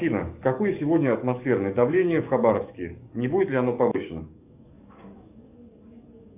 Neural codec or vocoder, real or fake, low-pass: codec, 44.1 kHz, 7.8 kbps, DAC; fake; 3.6 kHz